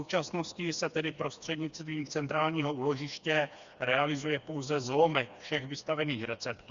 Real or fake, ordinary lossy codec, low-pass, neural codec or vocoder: fake; Opus, 64 kbps; 7.2 kHz; codec, 16 kHz, 2 kbps, FreqCodec, smaller model